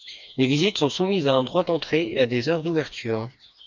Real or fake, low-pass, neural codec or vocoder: fake; 7.2 kHz; codec, 16 kHz, 2 kbps, FreqCodec, smaller model